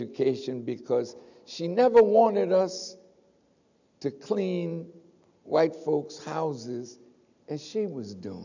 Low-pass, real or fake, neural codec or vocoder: 7.2 kHz; real; none